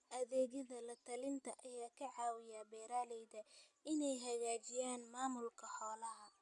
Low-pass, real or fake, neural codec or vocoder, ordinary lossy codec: 10.8 kHz; real; none; none